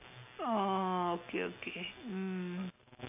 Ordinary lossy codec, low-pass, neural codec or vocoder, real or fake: none; 3.6 kHz; none; real